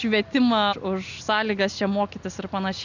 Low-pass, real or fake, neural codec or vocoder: 7.2 kHz; real; none